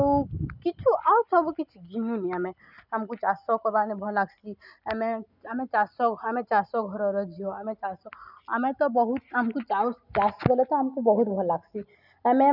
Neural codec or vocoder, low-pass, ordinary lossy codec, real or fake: none; 5.4 kHz; none; real